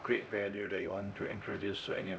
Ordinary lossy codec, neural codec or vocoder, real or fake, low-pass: none; codec, 16 kHz, 1 kbps, X-Codec, HuBERT features, trained on LibriSpeech; fake; none